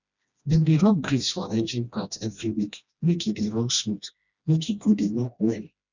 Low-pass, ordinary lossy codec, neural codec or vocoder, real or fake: 7.2 kHz; none; codec, 16 kHz, 1 kbps, FreqCodec, smaller model; fake